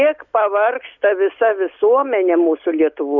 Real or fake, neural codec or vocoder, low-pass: real; none; 7.2 kHz